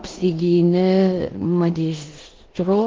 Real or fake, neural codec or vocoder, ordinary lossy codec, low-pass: fake; codec, 16 kHz in and 24 kHz out, 0.9 kbps, LongCat-Audio-Codec, four codebook decoder; Opus, 16 kbps; 7.2 kHz